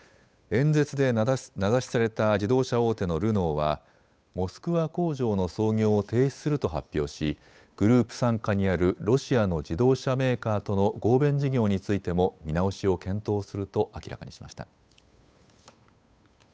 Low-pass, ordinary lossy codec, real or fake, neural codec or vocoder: none; none; fake; codec, 16 kHz, 8 kbps, FunCodec, trained on Chinese and English, 25 frames a second